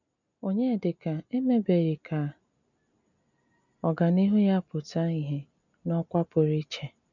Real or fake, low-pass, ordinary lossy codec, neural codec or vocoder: real; 7.2 kHz; none; none